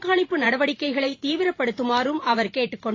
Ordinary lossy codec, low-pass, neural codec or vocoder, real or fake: AAC, 32 kbps; 7.2 kHz; vocoder, 44.1 kHz, 128 mel bands every 512 samples, BigVGAN v2; fake